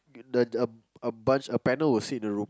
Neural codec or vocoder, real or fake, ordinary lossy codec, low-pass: none; real; none; none